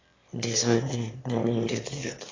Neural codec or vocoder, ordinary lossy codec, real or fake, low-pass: autoencoder, 22.05 kHz, a latent of 192 numbers a frame, VITS, trained on one speaker; AAC, 32 kbps; fake; 7.2 kHz